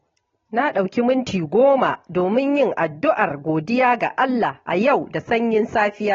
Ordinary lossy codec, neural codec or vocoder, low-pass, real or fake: AAC, 24 kbps; none; 7.2 kHz; real